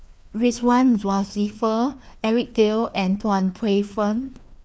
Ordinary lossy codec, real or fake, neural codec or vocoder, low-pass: none; fake; codec, 16 kHz, 2 kbps, FreqCodec, larger model; none